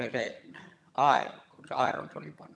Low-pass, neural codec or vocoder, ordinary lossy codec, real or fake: none; vocoder, 22.05 kHz, 80 mel bands, HiFi-GAN; none; fake